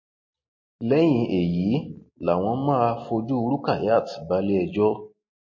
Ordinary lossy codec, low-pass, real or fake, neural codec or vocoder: MP3, 32 kbps; 7.2 kHz; real; none